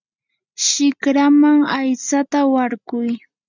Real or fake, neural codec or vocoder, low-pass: real; none; 7.2 kHz